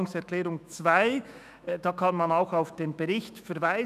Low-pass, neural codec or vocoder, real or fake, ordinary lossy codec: 14.4 kHz; autoencoder, 48 kHz, 128 numbers a frame, DAC-VAE, trained on Japanese speech; fake; none